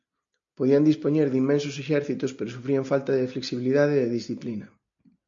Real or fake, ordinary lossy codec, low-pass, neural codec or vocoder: real; MP3, 48 kbps; 7.2 kHz; none